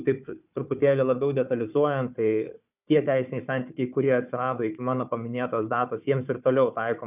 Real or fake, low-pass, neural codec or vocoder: fake; 3.6 kHz; codec, 16 kHz, 4 kbps, FunCodec, trained on Chinese and English, 50 frames a second